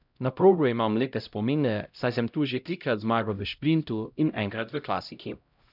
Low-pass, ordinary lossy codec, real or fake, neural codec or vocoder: 5.4 kHz; none; fake; codec, 16 kHz, 0.5 kbps, X-Codec, HuBERT features, trained on LibriSpeech